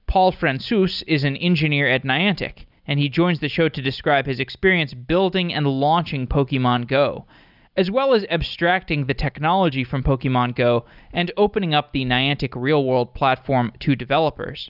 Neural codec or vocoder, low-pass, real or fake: none; 5.4 kHz; real